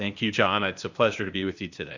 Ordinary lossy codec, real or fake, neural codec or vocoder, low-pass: Opus, 64 kbps; fake; codec, 16 kHz, 0.8 kbps, ZipCodec; 7.2 kHz